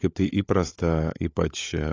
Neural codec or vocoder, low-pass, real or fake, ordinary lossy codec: codec, 16 kHz, 16 kbps, FunCodec, trained on LibriTTS, 50 frames a second; 7.2 kHz; fake; AAC, 32 kbps